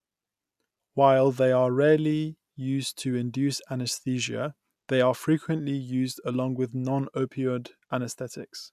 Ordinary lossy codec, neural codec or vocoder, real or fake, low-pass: none; none; real; 14.4 kHz